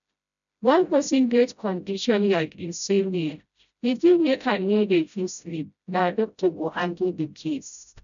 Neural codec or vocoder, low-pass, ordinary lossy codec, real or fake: codec, 16 kHz, 0.5 kbps, FreqCodec, smaller model; 7.2 kHz; none; fake